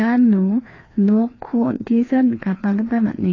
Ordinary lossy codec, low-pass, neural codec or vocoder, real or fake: AAC, 32 kbps; 7.2 kHz; codec, 16 kHz, 2 kbps, FreqCodec, larger model; fake